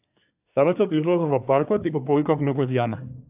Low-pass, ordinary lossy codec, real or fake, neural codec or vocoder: 3.6 kHz; AAC, 32 kbps; fake; codec, 24 kHz, 1 kbps, SNAC